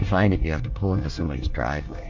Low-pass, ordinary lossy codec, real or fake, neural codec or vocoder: 7.2 kHz; MP3, 48 kbps; fake; codec, 24 kHz, 1 kbps, SNAC